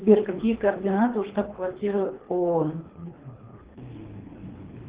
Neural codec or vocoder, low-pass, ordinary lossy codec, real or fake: codec, 24 kHz, 3 kbps, HILCodec; 3.6 kHz; Opus, 16 kbps; fake